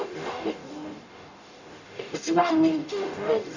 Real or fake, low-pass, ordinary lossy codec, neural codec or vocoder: fake; 7.2 kHz; none; codec, 44.1 kHz, 0.9 kbps, DAC